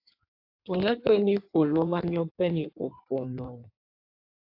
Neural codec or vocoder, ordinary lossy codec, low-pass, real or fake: codec, 16 kHz in and 24 kHz out, 1.1 kbps, FireRedTTS-2 codec; AAC, 32 kbps; 5.4 kHz; fake